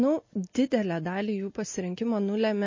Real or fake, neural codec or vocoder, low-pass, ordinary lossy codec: real; none; 7.2 kHz; MP3, 32 kbps